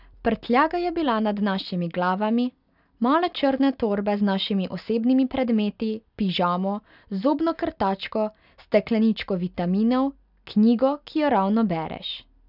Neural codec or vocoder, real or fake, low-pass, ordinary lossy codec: none; real; 5.4 kHz; none